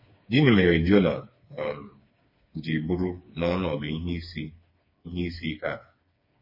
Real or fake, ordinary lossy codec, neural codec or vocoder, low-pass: fake; MP3, 24 kbps; codec, 16 kHz, 4 kbps, FreqCodec, smaller model; 5.4 kHz